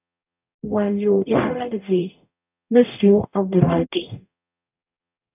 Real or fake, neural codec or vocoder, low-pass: fake; codec, 44.1 kHz, 0.9 kbps, DAC; 3.6 kHz